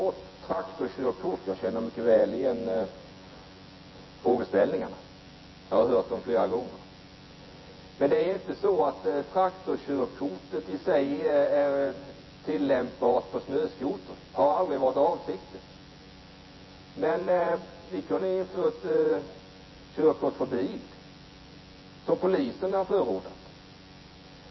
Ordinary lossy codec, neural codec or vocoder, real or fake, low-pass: MP3, 24 kbps; vocoder, 24 kHz, 100 mel bands, Vocos; fake; 7.2 kHz